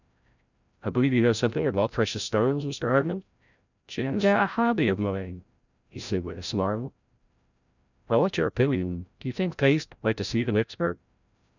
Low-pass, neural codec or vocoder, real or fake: 7.2 kHz; codec, 16 kHz, 0.5 kbps, FreqCodec, larger model; fake